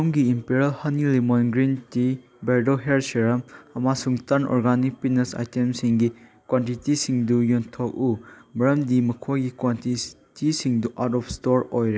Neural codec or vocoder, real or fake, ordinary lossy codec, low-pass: none; real; none; none